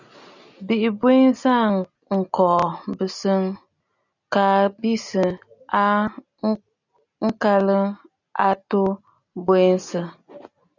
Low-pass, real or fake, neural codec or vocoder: 7.2 kHz; real; none